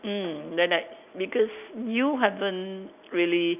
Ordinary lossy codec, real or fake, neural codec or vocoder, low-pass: none; real; none; 3.6 kHz